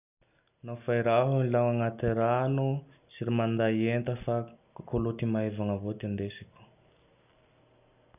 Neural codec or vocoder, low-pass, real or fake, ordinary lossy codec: none; 3.6 kHz; real; none